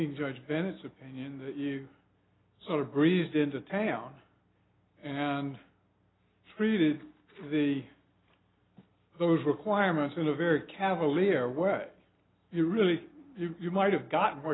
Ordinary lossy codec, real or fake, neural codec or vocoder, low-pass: AAC, 16 kbps; real; none; 7.2 kHz